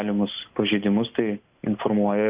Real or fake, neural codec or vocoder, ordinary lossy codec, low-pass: real; none; Opus, 64 kbps; 3.6 kHz